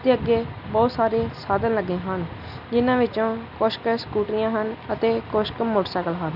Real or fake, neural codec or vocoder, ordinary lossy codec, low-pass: real; none; none; 5.4 kHz